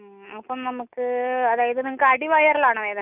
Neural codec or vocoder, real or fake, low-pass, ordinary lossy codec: none; real; 3.6 kHz; none